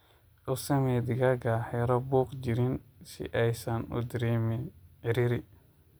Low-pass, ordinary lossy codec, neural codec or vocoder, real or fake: none; none; none; real